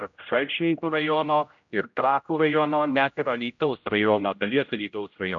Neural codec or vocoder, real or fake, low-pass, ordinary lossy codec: codec, 16 kHz, 0.5 kbps, X-Codec, HuBERT features, trained on general audio; fake; 7.2 kHz; AAC, 64 kbps